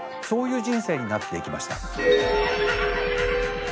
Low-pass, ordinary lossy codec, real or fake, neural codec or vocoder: none; none; real; none